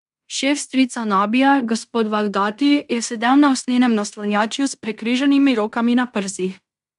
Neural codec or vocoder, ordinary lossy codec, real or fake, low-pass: codec, 16 kHz in and 24 kHz out, 0.9 kbps, LongCat-Audio-Codec, fine tuned four codebook decoder; AAC, 96 kbps; fake; 10.8 kHz